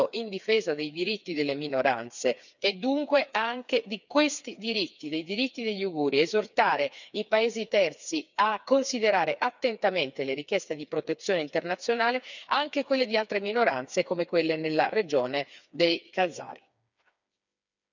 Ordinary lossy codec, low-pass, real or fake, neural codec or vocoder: none; 7.2 kHz; fake; codec, 16 kHz, 4 kbps, FreqCodec, smaller model